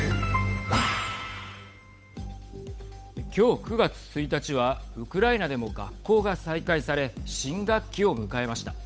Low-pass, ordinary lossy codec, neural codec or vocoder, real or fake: none; none; codec, 16 kHz, 8 kbps, FunCodec, trained on Chinese and English, 25 frames a second; fake